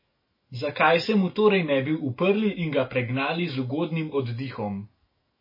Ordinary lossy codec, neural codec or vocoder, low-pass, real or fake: MP3, 24 kbps; autoencoder, 48 kHz, 128 numbers a frame, DAC-VAE, trained on Japanese speech; 5.4 kHz; fake